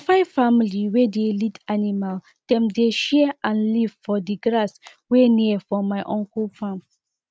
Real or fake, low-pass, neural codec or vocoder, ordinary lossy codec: real; none; none; none